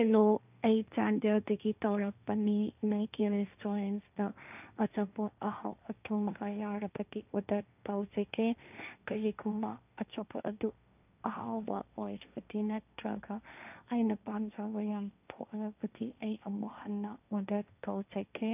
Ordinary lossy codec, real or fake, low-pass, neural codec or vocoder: none; fake; 3.6 kHz; codec, 16 kHz, 1.1 kbps, Voila-Tokenizer